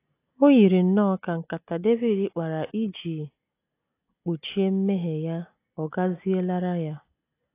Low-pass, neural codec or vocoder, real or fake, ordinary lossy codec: 3.6 kHz; none; real; AAC, 24 kbps